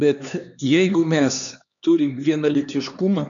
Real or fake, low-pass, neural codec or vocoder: fake; 7.2 kHz; codec, 16 kHz, 2 kbps, X-Codec, HuBERT features, trained on LibriSpeech